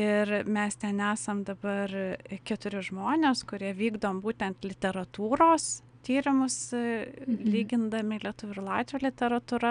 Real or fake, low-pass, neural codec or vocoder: real; 9.9 kHz; none